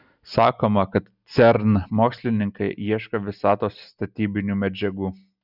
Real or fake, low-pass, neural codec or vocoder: real; 5.4 kHz; none